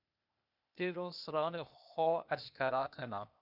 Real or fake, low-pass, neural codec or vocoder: fake; 5.4 kHz; codec, 16 kHz, 0.8 kbps, ZipCodec